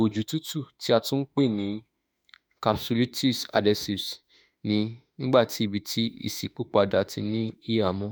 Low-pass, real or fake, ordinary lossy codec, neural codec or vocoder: none; fake; none; autoencoder, 48 kHz, 32 numbers a frame, DAC-VAE, trained on Japanese speech